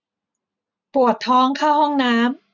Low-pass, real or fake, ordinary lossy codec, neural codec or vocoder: 7.2 kHz; real; none; none